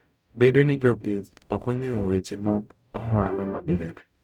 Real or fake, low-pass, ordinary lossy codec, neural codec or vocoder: fake; 19.8 kHz; none; codec, 44.1 kHz, 0.9 kbps, DAC